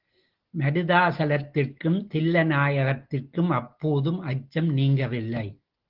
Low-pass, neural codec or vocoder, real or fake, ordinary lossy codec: 5.4 kHz; none; real; Opus, 16 kbps